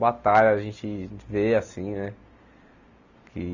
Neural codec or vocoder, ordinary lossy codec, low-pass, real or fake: none; none; 7.2 kHz; real